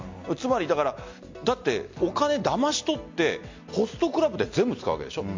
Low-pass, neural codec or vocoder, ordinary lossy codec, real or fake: 7.2 kHz; none; MP3, 48 kbps; real